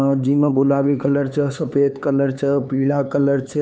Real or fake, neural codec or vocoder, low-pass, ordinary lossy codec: fake; codec, 16 kHz, 4 kbps, X-Codec, HuBERT features, trained on LibriSpeech; none; none